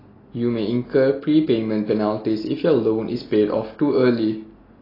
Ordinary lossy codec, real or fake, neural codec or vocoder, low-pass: AAC, 24 kbps; real; none; 5.4 kHz